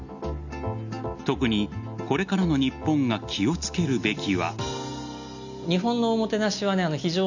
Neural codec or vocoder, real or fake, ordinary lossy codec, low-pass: none; real; none; 7.2 kHz